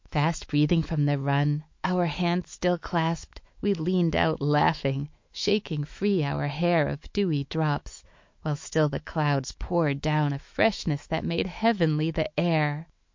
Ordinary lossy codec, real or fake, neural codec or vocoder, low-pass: MP3, 48 kbps; fake; autoencoder, 48 kHz, 128 numbers a frame, DAC-VAE, trained on Japanese speech; 7.2 kHz